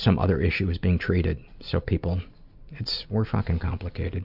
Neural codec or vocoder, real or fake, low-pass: none; real; 5.4 kHz